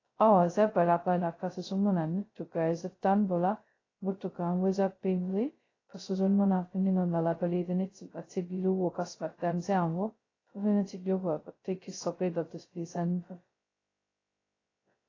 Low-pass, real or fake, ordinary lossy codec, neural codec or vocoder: 7.2 kHz; fake; AAC, 32 kbps; codec, 16 kHz, 0.2 kbps, FocalCodec